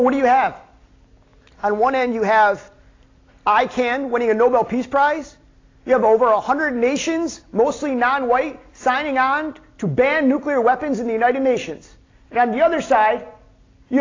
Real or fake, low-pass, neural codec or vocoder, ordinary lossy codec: real; 7.2 kHz; none; AAC, 32 kbps